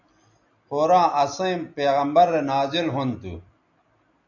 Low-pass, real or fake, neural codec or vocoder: 7.2 kHz; real; none